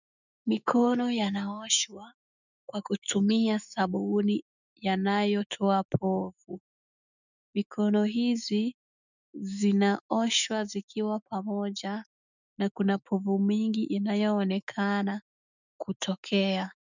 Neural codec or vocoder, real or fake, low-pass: autoencoder, 48 kHz, 128 numbers a frame, DAC-VAE, trained on Japanese speech; fake; 7.2 kHz